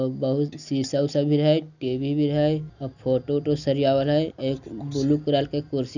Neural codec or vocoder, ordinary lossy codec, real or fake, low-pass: none; none; real; 7.2 kHz